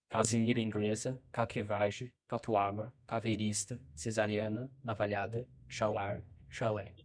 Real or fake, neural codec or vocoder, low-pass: fake; codec, 24 kHz, 0.9 kbps, WavTokenizer, medium music audio release; 9.9 kHz